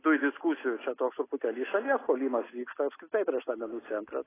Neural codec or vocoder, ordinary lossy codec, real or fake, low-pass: none; AAC, 16 kbps; real; 3.6 kHz